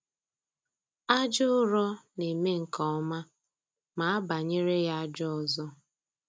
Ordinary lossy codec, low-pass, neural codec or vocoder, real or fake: none; none; none; real